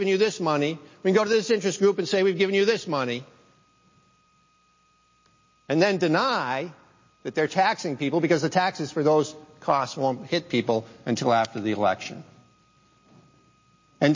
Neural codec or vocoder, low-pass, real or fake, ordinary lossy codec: none; 7.2 kHz; real; MP3, 32 kbps